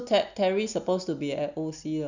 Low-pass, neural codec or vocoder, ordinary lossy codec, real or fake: 7.2 kHz; none; Opus, 64 kbps; real